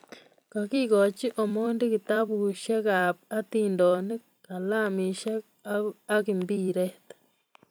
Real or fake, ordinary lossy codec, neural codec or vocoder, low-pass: fake; none; vocoder, 44.1 kHz, 128 mel bands every 256 samples, BigVGAN v2; none